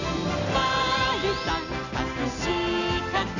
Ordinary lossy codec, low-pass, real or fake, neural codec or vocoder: none; 7.2 kHz; real; none